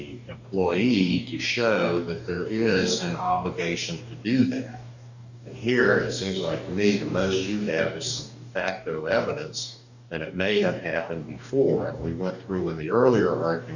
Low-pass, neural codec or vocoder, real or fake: 7.2 kHz; codec, 44.1 kHz, 2.6 kbps, DAC; fake